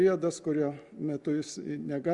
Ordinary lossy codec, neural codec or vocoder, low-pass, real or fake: Opus, 64 kbps; none; 10.8 kHz; real